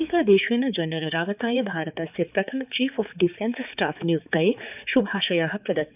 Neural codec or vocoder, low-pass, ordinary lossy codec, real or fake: codec, 16 kHz, 4 kbps, X-Codec, HuBERT features, trained on balanced general audio; 3.6 kHz; none; fake